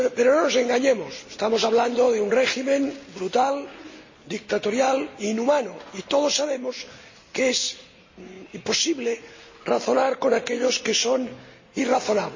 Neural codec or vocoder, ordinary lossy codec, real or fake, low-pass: none; MP3, 32 kbps; real; 7.2 kHz